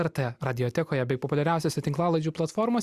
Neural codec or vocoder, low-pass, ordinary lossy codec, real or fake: none; 14.4 kHz; Opus, 64 kbps; real